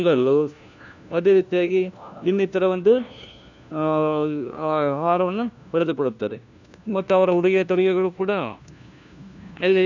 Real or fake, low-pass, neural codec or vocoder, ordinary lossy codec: fake; 7.2 kHz; codec, 16 kHz, 1 kbps, FunCodec, trained on LibriTTS, 50 frames a second; none